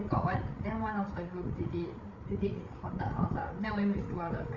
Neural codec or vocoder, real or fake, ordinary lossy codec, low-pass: codec, 16 kHz, 8 kbps, FreqCodec, larger model; fake; none; 7.2 kHz